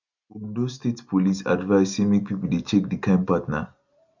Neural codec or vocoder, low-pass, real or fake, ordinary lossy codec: none; 7.2 kHz; real; none